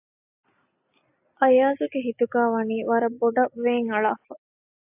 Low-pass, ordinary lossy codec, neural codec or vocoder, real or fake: 3.6 kHz; AAC, 32 kbps; none; real